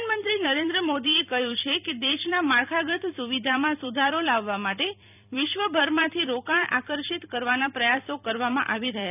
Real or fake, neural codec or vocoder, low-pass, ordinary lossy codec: fake; vocoder, 44.1 kHz, 128 mel bands every 256 samples, BigVGAN v2; 3.6 kHz; none